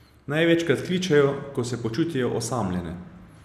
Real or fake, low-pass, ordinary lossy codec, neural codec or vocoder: real; 14.4 kHz; none; none